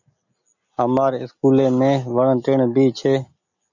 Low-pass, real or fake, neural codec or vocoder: 7.2 kHz; real; none